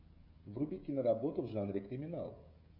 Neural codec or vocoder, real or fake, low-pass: codec, 16 kHz, 16 kbps, FreqCodec, smaller model; fake; 5.4 kHz